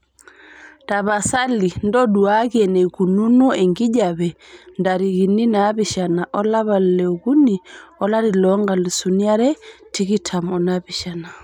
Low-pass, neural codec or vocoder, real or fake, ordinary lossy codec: 19.8 kHz; none; real; none